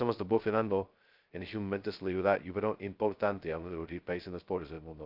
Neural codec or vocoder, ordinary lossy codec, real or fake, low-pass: codec, 16 kHz, 0.2 kbps, FocalCodec; Opus, 24 kbps; fake; 5.4 kHz